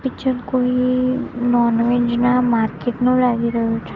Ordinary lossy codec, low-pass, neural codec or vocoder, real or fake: Opus, 24 kbps; 7.2 kHz; none; real